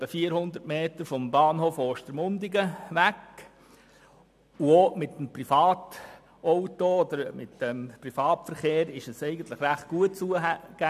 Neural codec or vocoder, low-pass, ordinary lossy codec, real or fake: none; 14.4 kHz; MP3, 96 kbps; real